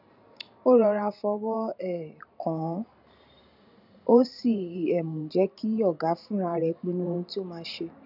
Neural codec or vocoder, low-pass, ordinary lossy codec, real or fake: vocoder, 44.1 kHz, 128 mel bands every 512 samples, BigVGAN v2; 5.4 kHz; none; fake